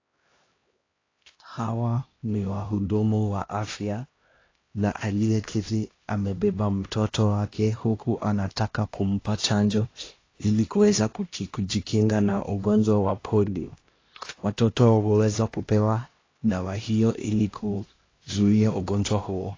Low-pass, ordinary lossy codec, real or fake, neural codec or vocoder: 7.2 kHz; AAC, 32 kbps; fake; codec, 16 kHz, 1 kbps, X-Codec, HuBERT features, trained on LibriSpeech